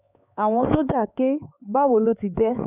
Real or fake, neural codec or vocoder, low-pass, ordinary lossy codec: fake; codec, 16 kHz, 4 kbps, X-Codec, HuBERT features, trained on LibriSpeech; 3.6 kHz; none